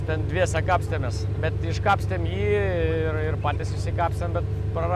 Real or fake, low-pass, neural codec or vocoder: real; 14.4 kHz; none